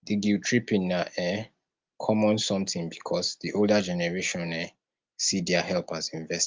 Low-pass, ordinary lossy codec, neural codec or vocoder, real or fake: 7.2 kHz; Opus, 32 kbps; none; real